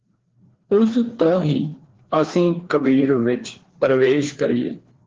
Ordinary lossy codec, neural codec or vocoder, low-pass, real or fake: Opus, 16 kbps; codec, 16 kHz, 2 kbps, FreqCodec, larger model; 7.2 kHz; fake